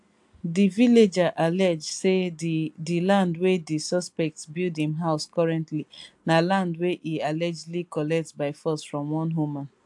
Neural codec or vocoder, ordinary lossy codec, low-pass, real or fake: none; AAC, 64 kbps; 10.8 kHz; real